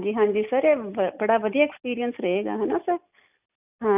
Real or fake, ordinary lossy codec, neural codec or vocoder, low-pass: real; none; none; 3.6 kHz